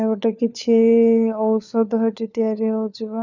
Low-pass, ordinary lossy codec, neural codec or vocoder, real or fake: 7.2 kHz; none; codec, 16 kHz, 4 kbps, FunCodec, trained on LibriTTS, 50 frames a second; fake